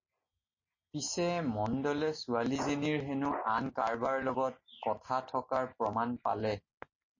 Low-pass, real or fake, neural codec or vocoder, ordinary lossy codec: 7.2 kHz; real; none; MP3, 32 kbps